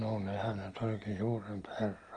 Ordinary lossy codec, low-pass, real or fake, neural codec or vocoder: Opus, 24 kbps; 9.9 kHz; real; none